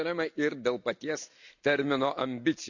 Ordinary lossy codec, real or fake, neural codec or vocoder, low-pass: none; real; none; 7.2 kHz